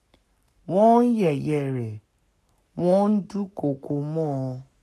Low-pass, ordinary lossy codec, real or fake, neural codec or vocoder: 14.4 kHz; none; fake; codec, 44.1 kHz, 7.8 kbps, Pupu-Codec